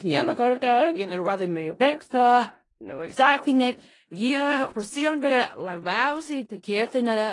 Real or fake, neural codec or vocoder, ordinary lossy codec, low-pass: fake; codec, 16 kHz in and 24 kHz out, 0.4 kbps, LongCat-Audio-Codec, four codebook decoder; AAC, 32 kbps; 10.8 kHz